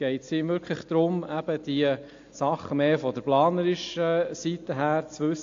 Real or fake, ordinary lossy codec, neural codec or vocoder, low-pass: real; AAC, 48 kbps; none; 7.2 kHz